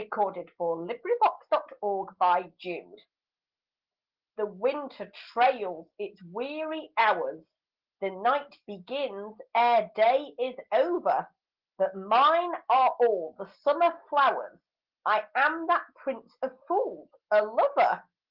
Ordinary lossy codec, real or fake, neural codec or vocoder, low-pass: Opus, 16 kbps; real; none; 5.4 kHz